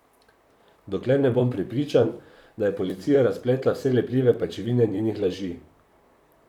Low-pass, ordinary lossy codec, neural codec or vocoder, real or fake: 19.8 kHz; none; vocoder, 44.1 kHz, 128 mel bands, Pupu-Vocoder; fake